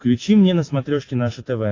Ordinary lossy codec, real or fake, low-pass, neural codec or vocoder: AAC, 32 kbps; real; 7.2 kHz; none